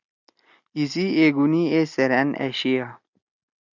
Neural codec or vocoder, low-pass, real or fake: none; 7.2 kHz; real